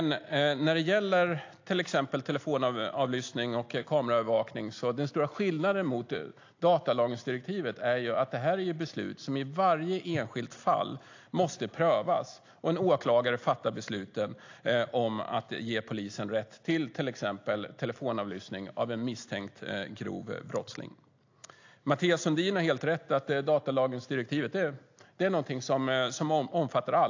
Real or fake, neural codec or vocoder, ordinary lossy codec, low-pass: real; none; AAC, 48 kbps; 7.2 kHz